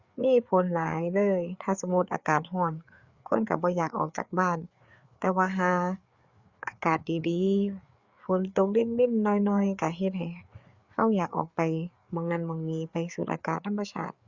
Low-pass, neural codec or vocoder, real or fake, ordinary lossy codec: 7.2 kHz; codec, 16 kHz, 4 kbps, FreqCodec, larger model; fake; Opus, 64 kbps